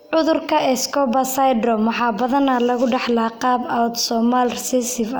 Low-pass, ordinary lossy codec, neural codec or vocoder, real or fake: none; none; none; real